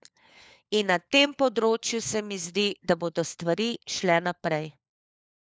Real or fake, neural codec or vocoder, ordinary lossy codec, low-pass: fake; codec, 16 kHz, 4 kbps, FunCodec, trained on LibriTTS, 50 frames a second; none; none